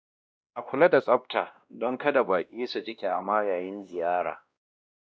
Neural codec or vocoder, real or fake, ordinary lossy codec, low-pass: codec, 16 kHz, 1 kbps, X-Codec, WavLM features, trained on Multilingual LibriSpeech; fake; none; none